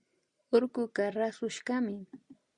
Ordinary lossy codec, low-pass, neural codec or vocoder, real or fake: Opus, 64 kbps; 9.9 kHz; none; real